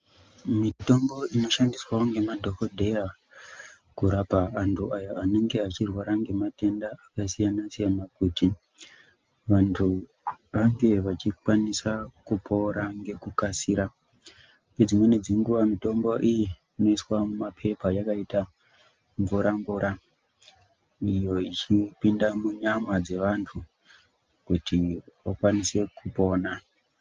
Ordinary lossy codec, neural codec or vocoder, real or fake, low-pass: Opus, 24 kbps; none; real; 7.2 kHz